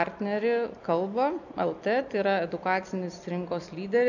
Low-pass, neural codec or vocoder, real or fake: 7.2 kHz; none; real